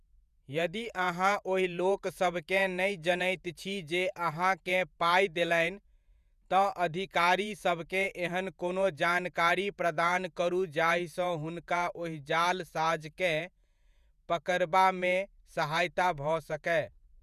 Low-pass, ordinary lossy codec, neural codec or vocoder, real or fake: 14.4 kHz; none; vocoder, 48 kHz, 128 mel bands, Vocos; fake